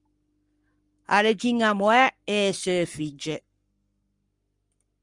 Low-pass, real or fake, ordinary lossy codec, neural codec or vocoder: 10.8 kHz; fake; Opus, 32 kbps; codec, 44.1 kHz, 7.8 kbps, Pupu-Codec